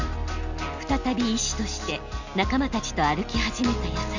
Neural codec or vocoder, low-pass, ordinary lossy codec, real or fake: none; 7.2 kHz; none; real